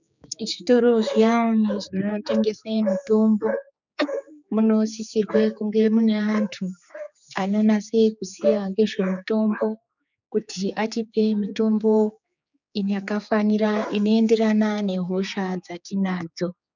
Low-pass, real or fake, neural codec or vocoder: 7.2 kHz; fake; codec, 16 kHz, 4 kbps, X-Codec, HuBERT features, trained on general audio